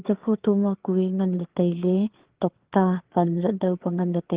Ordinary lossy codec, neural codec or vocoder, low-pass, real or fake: Opus, 16 kbps; codec, 16 kHz, 4 kbps, FreqCodec, larger model; 3.6 kHz; fake